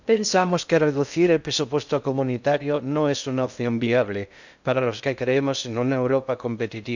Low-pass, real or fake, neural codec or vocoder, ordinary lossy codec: 7.2 kHz; fake; codec, 16 kHz in and 24 kHz out, 0.6 kbps, FocalCodec, streaming, 2048 codes; none